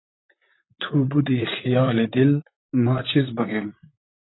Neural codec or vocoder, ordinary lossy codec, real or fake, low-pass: vocoder, 44.1 kHz, 128 mel bands, Pupu-Vocoder; AAC, 16 kbps; fake; 7.2 kHz